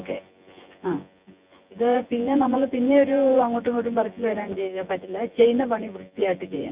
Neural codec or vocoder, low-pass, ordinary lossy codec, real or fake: vocoder, 24 kHz, 100 mel bands, Vocos; 3.6 kHz; Opus, 64 kbps; fake